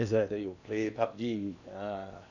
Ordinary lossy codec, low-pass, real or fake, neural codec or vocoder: none; 7.2 kHz; fake; codec, 16 kHz in and 24 kHz out, 0.8 kbps, FocalCodec, streaming, 65536 codes